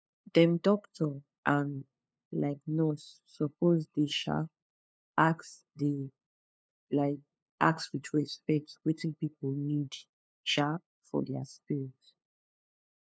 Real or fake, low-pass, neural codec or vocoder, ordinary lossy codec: fake; none; codec, 16 kHz, 2 kbps, FunCodec, trained on LibriTTS, 25 frames a second; none